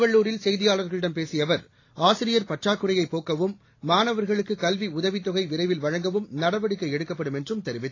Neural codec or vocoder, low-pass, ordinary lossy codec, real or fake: none; 7.2 kHz; AAC, 32 kbps; real